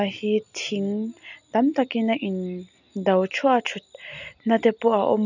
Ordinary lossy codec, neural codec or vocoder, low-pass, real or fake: none; none; 7.2 kHz; real